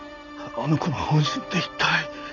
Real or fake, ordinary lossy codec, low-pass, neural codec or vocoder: real; none; 7.2 kHz; none